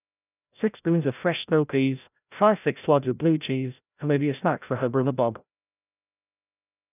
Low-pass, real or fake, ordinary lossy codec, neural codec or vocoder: 3.6 kHz; fake; none; codec, 16 kHz, 0.5 kbps, FreqCodec, larger model